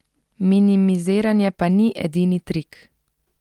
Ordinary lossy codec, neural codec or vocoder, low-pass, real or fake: Opus, 24 kbps; none; 19.8 kHz; real